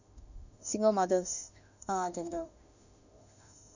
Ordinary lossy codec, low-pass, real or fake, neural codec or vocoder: none; 7.2 kHz; fake; autoencoder, 48 kHz, 32 numbers a frame, DAC-VAE, trained on Japanese speech